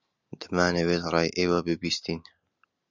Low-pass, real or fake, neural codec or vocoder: 7.2 kHz; real; none